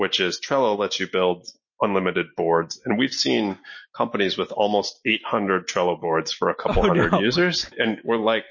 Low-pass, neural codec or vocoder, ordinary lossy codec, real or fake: 7.2 kHz; none; MP3, 32 kbps; real